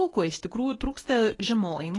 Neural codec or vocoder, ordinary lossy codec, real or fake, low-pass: codec, 24 kHz, 0.9 kbps, WavTokenizer, medium speech release version 1; AAC, 32 kbps; fake; 10.8 kHz